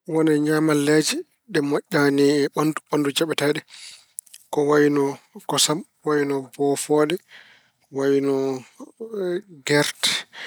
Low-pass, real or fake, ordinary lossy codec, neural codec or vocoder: none; real; none; none